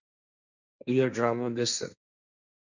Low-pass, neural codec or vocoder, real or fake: 7.2 kHz; codec, 16 kHz, 1.1 kbps, Voila-Tokenizer; fake